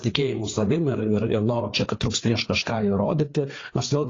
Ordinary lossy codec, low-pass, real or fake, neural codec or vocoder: AAC, 32 kbps; 7.2 kHz; fake; codec, 16 kHz, 4 kbps, FreqCodec, larger model